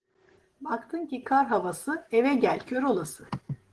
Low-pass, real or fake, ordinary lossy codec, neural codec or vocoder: 10.8 kHz; real; Opus, 16 kbps; none